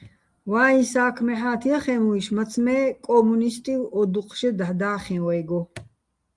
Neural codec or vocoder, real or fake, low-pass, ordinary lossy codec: none; real; 10.8 kHz; Opus, 32 kbps